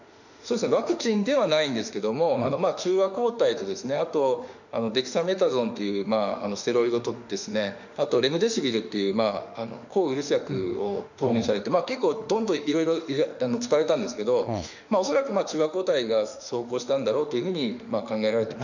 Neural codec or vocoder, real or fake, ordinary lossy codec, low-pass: autoencoder, 48 kHz, 32 numbers a frame, DAC-VAE, trained on Japanese speech; fake; none; 7.2 kHz